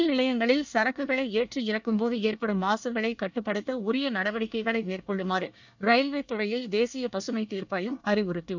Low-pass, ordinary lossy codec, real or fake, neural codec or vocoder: 7.2 kHz; none; fake; codec, 24 kHz, 1 kbps, SNAC